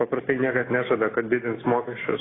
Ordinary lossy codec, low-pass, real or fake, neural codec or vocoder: AAC, 16 kbps; 7.2 kHz; real; none